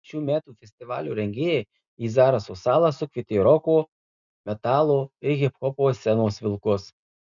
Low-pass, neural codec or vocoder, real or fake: 7.2 kHz; none; real